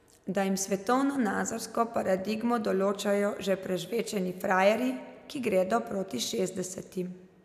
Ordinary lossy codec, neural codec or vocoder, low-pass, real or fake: none; none; 14.4 kHz; real